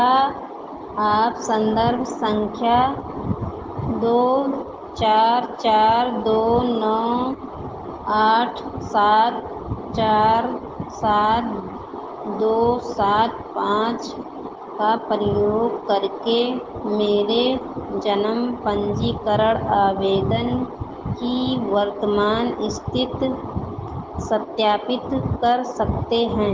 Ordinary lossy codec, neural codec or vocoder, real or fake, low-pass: Opus, 16 kbps; none; real; 7.2 kHz